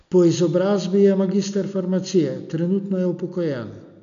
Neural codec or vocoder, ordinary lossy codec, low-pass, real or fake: none; none; 7.2 kHz; real